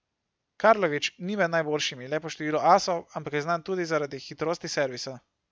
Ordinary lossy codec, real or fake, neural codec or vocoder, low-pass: none; real; none; none